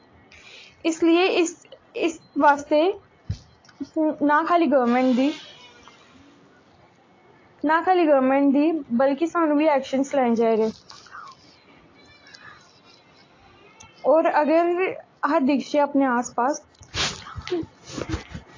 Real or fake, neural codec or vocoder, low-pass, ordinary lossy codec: real; none; 7.2 kHz; AAC, 32 kbps